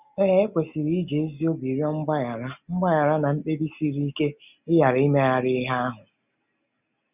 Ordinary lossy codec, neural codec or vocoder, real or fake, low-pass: none; none; real; 3.6 kHz